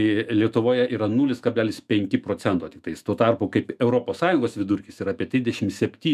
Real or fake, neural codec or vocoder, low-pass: fake; autoencoder, 48 kHz, 128 numbers a frame, DAC-VAE, trained on Japanese speech; 14.4 kHz